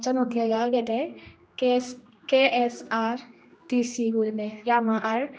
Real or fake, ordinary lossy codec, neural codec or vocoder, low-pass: fake; none; codec, 16 kHz, 1 kbps, X-Codec, HuBERT features, trained on general audio; none